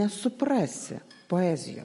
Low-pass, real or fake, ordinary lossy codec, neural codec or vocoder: 14.4 kHz; real; MP3, 48 kbps; none